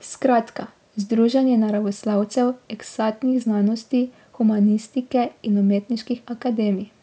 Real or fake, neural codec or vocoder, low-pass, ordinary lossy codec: real; none; none; none